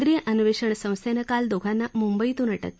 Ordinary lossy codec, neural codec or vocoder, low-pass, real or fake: none; none; none; real